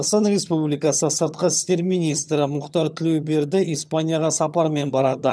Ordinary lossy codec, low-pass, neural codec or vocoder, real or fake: none; none; vocoder, 22.05 kHz, 80 mel bands, HiFi-GAN; fake